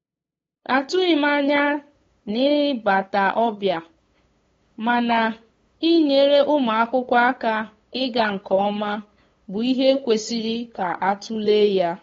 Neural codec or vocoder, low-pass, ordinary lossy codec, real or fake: codec, 16 kHz, 8 kbps, FunCodec, trained on LibriTTS, 25 frames a second; 7.2 kHz; AAC, 32 kbps; fake